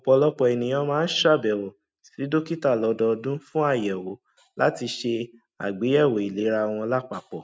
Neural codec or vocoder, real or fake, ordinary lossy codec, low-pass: none; real; none; none